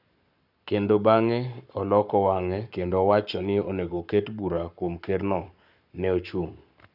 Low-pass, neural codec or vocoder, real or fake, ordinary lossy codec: 5.4 kHz; codec, 44.1 kHz, 7.8 kbps, Pupu-Codec; fake; none